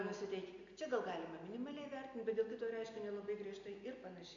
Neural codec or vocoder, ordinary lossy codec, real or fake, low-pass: none; MP3, 64 kbps; real; 7.2 kHz